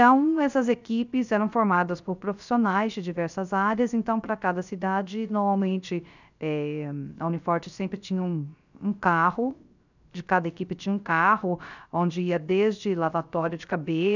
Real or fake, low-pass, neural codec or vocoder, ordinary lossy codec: fake; 7.2 kHz; codec, 16 kHz, 0.3 kbps, FocalCodec; none